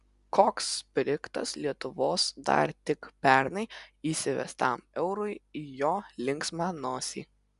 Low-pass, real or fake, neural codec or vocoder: 10.8 kHz; real; none